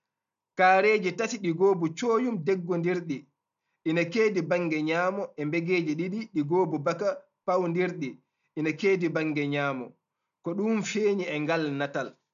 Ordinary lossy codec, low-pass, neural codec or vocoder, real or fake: none; 7.2 kHz; none; real